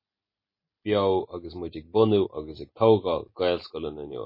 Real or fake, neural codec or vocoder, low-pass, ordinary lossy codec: real; none; 5.4 kHz; MP3, 24 kbps